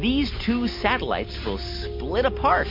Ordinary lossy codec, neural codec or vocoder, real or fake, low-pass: MP3, 32 kbps; none; real; 5.4 kHz